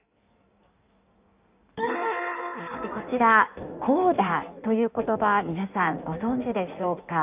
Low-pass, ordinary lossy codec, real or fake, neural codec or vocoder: 3.6 kHz; none; fake; codec, 16 kHz in and 24 kHz out, 1.1 kbps, FireRedTTS-2 codec